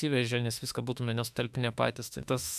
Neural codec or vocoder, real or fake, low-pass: autoencoder, 48 kHz, 32 numbers a frame, DAC-VAE, trained on Japanese speech; fake; 14.4 kHz